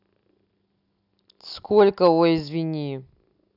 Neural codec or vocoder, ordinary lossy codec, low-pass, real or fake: none; none; 5.4 kHz; real